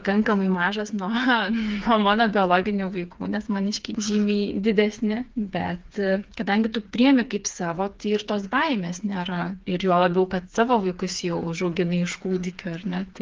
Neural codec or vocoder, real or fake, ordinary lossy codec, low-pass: codec, 16 kHz, 4 kbps, FreqCodec, smaller model; fake; Opus, 24 kbps; 7.2 kHz